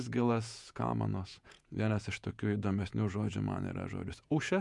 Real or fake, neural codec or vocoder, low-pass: fake; vocoder, 48 kHz, 128 mel bands, Vocos; 10.8 kHz